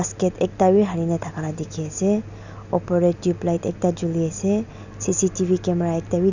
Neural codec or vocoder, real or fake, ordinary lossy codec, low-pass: none; real; none; 7.2 kHz